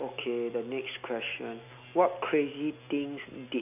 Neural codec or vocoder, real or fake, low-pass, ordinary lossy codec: none; real; 3.6 kHz; none